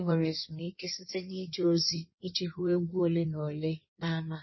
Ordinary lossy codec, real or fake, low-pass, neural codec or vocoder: MP3, 24 kbps; fake; 7.2 kHz; codec, 16 kHz in and 24 kHz out, 1.1 kbps, FireRedTTS-2 codec